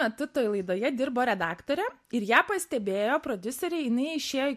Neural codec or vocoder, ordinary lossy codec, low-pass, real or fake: none; MP3, 64 kbps; 14.4 kHz; real